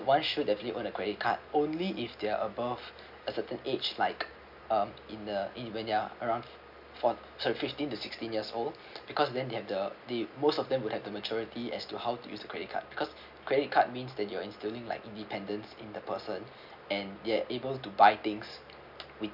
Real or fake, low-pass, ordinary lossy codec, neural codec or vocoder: real; 5.4 kHz; none; none